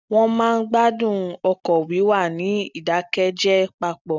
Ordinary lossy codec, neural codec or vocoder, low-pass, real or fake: none; none; 7.2 kHz; real